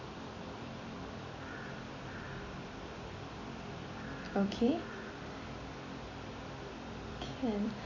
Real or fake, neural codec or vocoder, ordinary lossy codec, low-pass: fake; vocoder, 44.1 kHz, 128 mel bands every 256 samples, BigVGAN v2; AAC, 48 kbps; 7.2 kHz